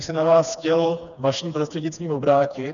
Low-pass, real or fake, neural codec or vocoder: 7.2 kHz; fake; codec, 16 kHz, 2 kbps, FreqCodec, smaller model